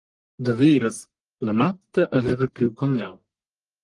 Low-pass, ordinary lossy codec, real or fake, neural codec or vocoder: 10.8 kHz; Opus, 24 kbps; fake; codec, 44.1 kHz, 1.7 kbps, Pupu-Codec